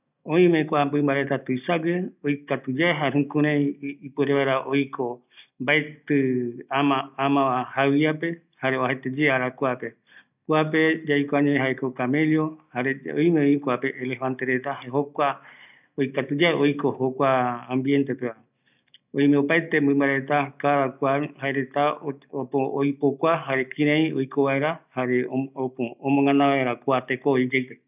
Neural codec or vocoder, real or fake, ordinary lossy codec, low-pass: none; real; none; 3.6 kHz